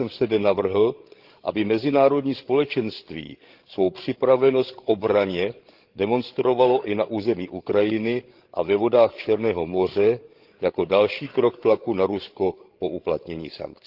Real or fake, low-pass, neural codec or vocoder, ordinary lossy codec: fake; 5.4 kHz; codec, 16 kHz, 16 kbps, FreqCodec, larger model; Opus, 16 kbps